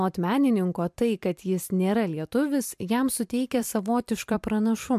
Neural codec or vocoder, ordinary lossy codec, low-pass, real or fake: vocoder, 44.1 kHz, 128 mel bands every 256 samples, BigVGAN v2; MP3, 96 kbps; 14.4 kHz; fake